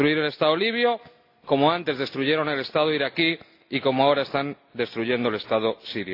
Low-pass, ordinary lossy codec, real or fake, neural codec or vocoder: 5.4 kHz; AAC, 32 kbps; real; none